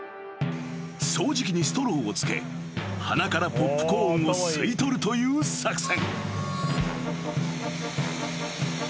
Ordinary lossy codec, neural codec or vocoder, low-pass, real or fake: none; none; none; real